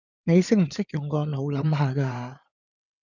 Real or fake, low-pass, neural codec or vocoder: fake; 7.2 kHz; codec, 16 kHz in and 24 kHz out, 2.2 kbps, FireRedTTS-2 codec